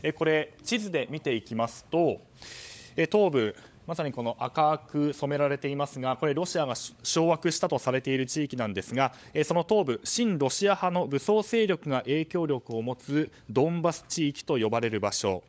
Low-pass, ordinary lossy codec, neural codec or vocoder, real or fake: none; none; codec, 16 kHz, 16 kbps, FunCodec, trained on LibriTTS, 50 frames a second; fake